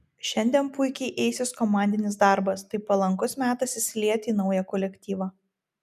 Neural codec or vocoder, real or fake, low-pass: none; real; 14.4 kHz